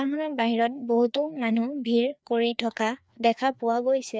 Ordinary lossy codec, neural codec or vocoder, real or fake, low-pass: none; codec, 16 kHz, 2 kbps, FreqCodec, larger model; fake; none